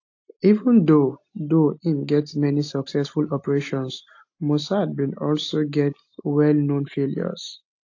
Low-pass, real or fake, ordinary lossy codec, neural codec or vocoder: 7.2 kHz; real; AAC, 48 kbps; none